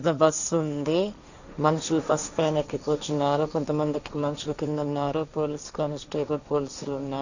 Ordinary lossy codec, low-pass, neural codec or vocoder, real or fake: none; 7.2 kHz; codec, 16 kHz, 1.1 kbps, Voila-Tokenizer; fake